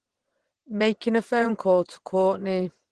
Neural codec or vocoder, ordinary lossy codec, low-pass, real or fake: vocoder, 22.05 kHz, 80 mel bands, WaveNeXt; Opus, 16 kbps; 9.9 kHz; fake